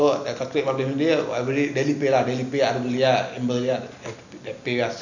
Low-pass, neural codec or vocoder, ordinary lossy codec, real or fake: 7.2 kHz; none; none; real